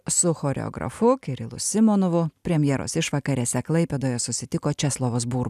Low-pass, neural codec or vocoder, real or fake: 14.4 kHz; none; real